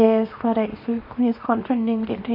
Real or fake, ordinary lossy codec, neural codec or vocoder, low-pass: fake; MP3, 32 kbps; codec, 24 kHz, 0.9 kbps, WavTokenizer, small release; 5.4 kHz